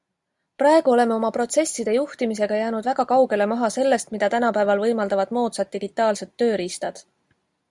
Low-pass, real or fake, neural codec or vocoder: 10.8 kHz; real; none